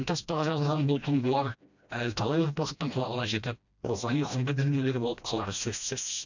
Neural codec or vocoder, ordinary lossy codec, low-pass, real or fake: codec, 16 kHz, 1 kbps, FreqCodec, smaller model; none; 7.2 kHz; fake